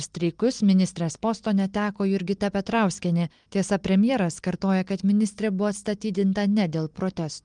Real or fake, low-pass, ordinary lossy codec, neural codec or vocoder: real; 9.9 kHz; Opus, 24 kbps; none